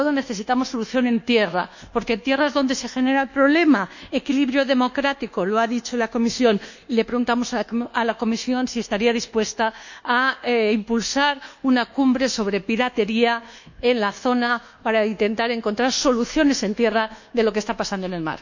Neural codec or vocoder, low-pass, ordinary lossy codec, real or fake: codec, 24 kHz, 1.2 kbps, DualCodec; 7.2 kHz; none; fake